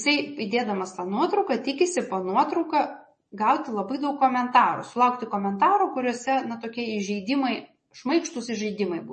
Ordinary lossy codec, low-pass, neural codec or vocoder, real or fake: MP3, 32 kbps; 10.8 kHz; none; real